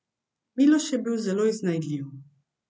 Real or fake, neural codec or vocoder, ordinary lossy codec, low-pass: real; none; none; none